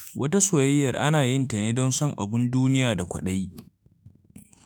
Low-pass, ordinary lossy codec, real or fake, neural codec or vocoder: none; none; fake; autoencoder, 48 kHz, 32 numbers a frame, DAC-VAE, trained on Japanese speech